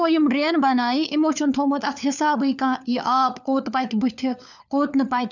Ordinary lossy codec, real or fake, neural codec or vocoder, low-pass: none; fake; codec, 16 kHz, 4 kbps, FunCodec, trained on LibriTTS, 50 frames a second; 7.2 kHz